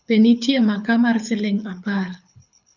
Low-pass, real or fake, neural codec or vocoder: 7.2 kHz; fake; codec, 24 kHz, 6 kbps, HILCodec